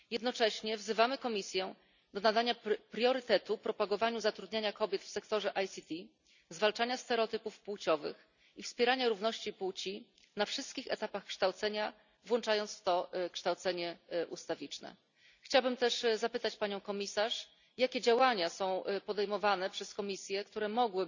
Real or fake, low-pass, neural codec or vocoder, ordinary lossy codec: real; 7.2 kHz; none; none